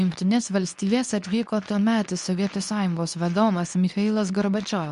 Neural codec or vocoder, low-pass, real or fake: codec, 24 kHz, 0.9 kbps, WavTokenizer, medium speech release version 2; 10.8 kHz; fake